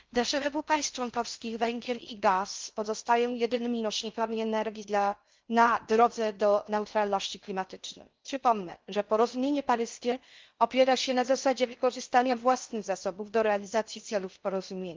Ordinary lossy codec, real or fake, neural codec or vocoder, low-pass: Opus, 32 kbps; fake; codec, 16 kHz in and 24 kHz out, 0.6 kbps, FocalCodec, streaming, 4096 codes; 7.2 kHz